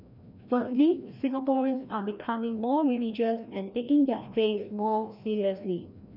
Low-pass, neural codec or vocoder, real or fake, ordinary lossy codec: 5.4 kHz; codec, 16 kHz, 1 kbps, FreqCodec, larger model; fake; none